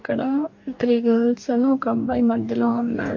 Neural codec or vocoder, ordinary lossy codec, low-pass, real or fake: codec, 44.1 kHz, 2.6 kbps, DAC; MP3, 48 kbps; 7.2 kHz; fake